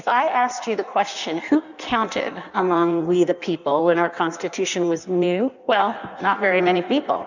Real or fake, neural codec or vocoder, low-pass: fake; codec, 16 kHz in and 24 kHz out, 1.1 kbps, FireRedTTS-2 codec; 7.2 kHz